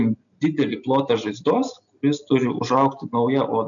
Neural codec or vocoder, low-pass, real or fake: none; 7.2 kHz; real